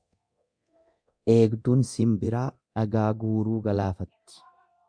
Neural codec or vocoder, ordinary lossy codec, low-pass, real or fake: codec, 24 kHz, 0.9 kbps, DualCodec; MP3, 64 kbps; 9.9 kHz; fake